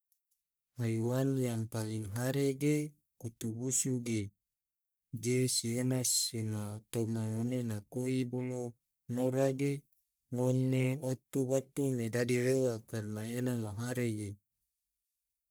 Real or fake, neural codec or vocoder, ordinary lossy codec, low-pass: fake; codec, 44.1 kHz, 1.7 kbps, Pupu-Codec; none; none